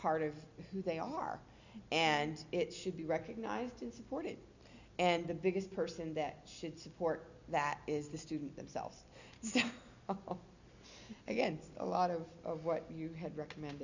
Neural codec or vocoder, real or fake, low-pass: none; real; 7.2 kHz